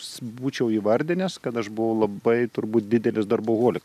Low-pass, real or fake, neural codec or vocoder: 14.4 kHz; real; none